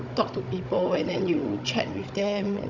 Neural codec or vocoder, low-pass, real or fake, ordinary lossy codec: codec, 16 kHz, 16 kbps, FunCodec, trained on Chinese and English, 50 frames a second; 7.2 kHz; fake; none